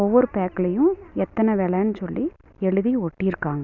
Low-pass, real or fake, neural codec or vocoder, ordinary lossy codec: 7.2 kHz; real; none; none